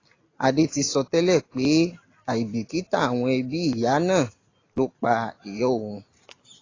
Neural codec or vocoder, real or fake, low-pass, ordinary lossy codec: none; real; 7.2 kHz; AAC, 32 kbps